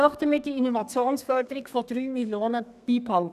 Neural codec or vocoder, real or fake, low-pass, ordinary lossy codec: codec, 32 kHz, 1.9 kbps, SNAC; fake; 14.4 kHz; none